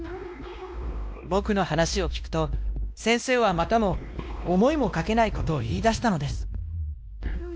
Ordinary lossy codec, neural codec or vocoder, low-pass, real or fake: none; codec, 16 kHz, 1 kbps, X-Codec, WavLM features, trained on Multilingual LibriSpeech; none; fake